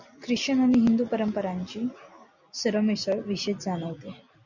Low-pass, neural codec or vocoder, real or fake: 7.2 kHz; none; real